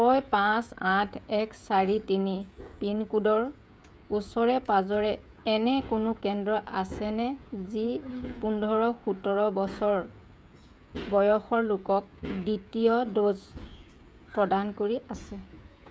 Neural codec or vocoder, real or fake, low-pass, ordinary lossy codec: codec, 16 kHz, 16 kbps, FreqCodec, smaller model; fake; none; none